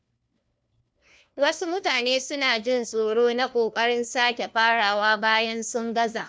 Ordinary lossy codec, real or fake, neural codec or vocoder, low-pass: none; fake; codec, 16 kHz, 1 kbps, FunCodec, trained on LibriTTS, 50 frames a second; none